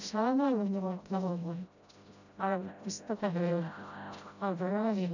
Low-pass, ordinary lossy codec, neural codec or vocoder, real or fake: 7.2 kHz; none; codec, 16 kHz, 0.5 kbps, FreqCodec, smaller model; fake